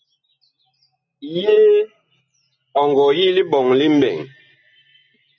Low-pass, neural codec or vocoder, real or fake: 7.2 kHz; none; real